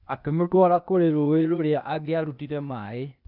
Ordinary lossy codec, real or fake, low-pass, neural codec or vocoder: none; fake; 5.4 kHz; codec, 16 kHz, 0.8 kbps, ZipCodec